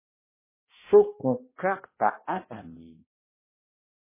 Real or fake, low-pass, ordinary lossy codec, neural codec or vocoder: fake; 3.6 kHz; MP3, 16 kbps; codec, 16 kHz, 1 kbps, X-Codec, HuBERT features, trained on balanced general audio